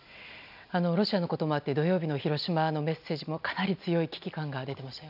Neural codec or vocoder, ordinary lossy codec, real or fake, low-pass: none; none; real; 5.4 kHz